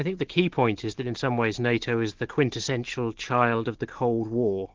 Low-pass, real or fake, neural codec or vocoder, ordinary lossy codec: 7.2 kHz; real; none; Opus, 16 kbps